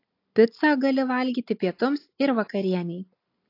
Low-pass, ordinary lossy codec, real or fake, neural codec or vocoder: 5.4 kHz; AAC, 32 kbps; real; none